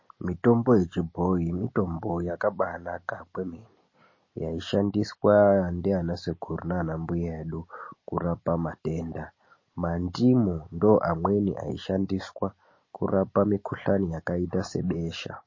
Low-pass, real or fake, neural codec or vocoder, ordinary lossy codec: 7.2 kHz; real; none; MP3, 32 kbps